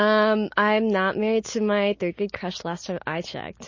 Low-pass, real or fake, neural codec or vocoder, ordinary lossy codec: 7.2 kHz; real; none; MP3, 32 kbps